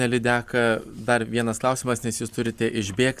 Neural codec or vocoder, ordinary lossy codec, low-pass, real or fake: none; Opus, 64 kbps; 14.4 kHz; real